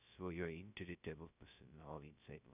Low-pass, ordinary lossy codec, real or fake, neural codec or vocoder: 3.6 kHz; none; fake; codec, 16 kHz, 0.2 kbps, FocalCodec